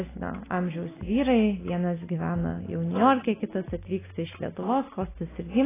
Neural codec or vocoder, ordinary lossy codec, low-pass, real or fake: none; AAC, 16 kbps; 3.6 kHz; real